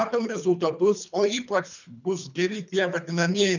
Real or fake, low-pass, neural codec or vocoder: fake; 7.2 kHz; codec, 24 kHz, 3 kbps, HILCodec